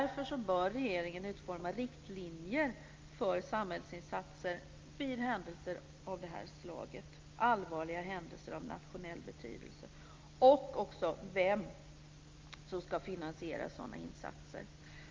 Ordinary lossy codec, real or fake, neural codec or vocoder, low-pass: Opus, 24 kbps; real; none; 7.2 kHz